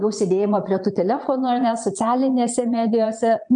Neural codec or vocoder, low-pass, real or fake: vocoder, 22.05 kHz, 80 mel bands, Vocos; 9.9 kHz; fake